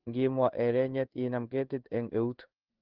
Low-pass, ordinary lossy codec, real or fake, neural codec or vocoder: 5.4 kHz; Opus, 16 kbps; fake; codec, 16 kHz in and 24 kHz out, 1 kbps, XY-Tokenizer